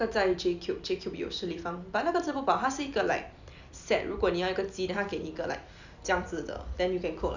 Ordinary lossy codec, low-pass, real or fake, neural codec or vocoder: none; 7.2 kHz; real; none